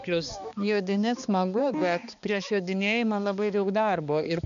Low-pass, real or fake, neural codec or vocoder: 7.2 kHz; fake; codec, 16 kHz, 2 kbps, X-Codec, HuBERT features, trained on balanced general audio